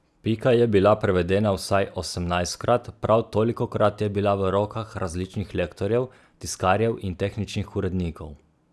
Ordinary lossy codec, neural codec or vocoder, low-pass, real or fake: none; none; none; real